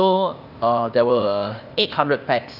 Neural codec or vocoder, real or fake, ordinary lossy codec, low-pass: codec, 16 kHz, 0.8 kbps, ZipCodec; fake; none; 5.4 kHz